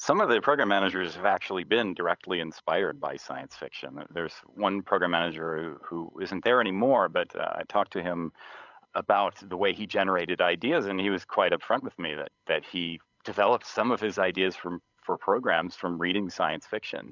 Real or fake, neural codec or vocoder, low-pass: fake; codec, 16 kHz, 16 kbps, FreqCodec, larger model; 7.2 kHz